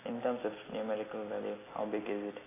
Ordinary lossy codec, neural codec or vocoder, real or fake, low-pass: AAC, 16 kbps; none; real; 3.6 kHz